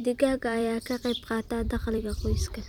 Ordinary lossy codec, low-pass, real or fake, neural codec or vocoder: none; 19.8 kHz; fake; vocoder, 44.1 kHz, 128 mel bands every 256 samples, BigVGAN v2